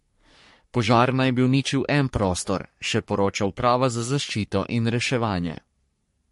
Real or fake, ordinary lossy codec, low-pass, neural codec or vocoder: fake; MP3, 48 kbps; 14.4 kHz; codec, 44.1 kHz, 3.4 kbps, Pupu-Codec